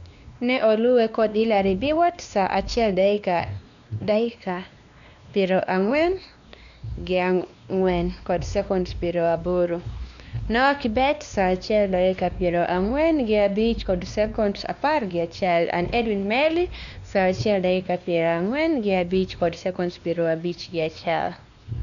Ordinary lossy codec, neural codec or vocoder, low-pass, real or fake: none; codec, 16 kHz, 2 kbps, X-Codec, WavLM features, trained on Multilingual LibriSpeech; 7.2 kHz; fake